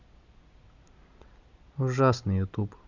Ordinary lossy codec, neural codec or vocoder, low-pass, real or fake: Opus, 64 kbps; none; 7.2 kHz; real